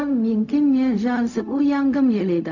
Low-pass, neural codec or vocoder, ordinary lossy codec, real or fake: 7.2 kHz; codec, 16 kHz, 0.4 kbps, LongCat-Audio-Codec; none; fake